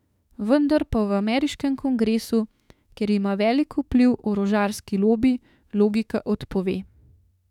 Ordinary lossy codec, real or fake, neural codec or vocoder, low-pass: none; fake; autoencoder, 48 kHz, 32 numbers a frame, DAC-VAE, trained on Japanese speech; 19.8 kHz